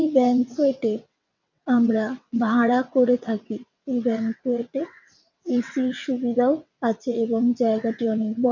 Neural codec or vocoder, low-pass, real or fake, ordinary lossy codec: vocoder, 22.05 kHz, 80 mel bands, WaveNeXt; 7.2 kHz; fake; none